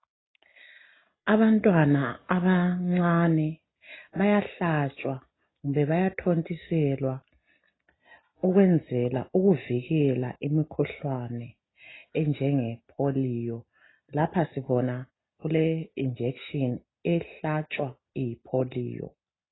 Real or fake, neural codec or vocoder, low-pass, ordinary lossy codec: real; none; 7.2 kHz; AAC, 16 kbps